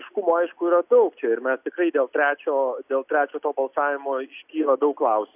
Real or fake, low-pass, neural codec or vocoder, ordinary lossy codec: real; 3.6 kHz; none; AAC, 32 kbps